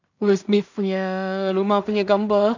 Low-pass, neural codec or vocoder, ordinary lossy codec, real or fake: 7.2 kHz; codec, 16 kHz in and 24 kHz out, 0.4 kbps, LongCat-Audio-Codec, two codebook decoder; none; fake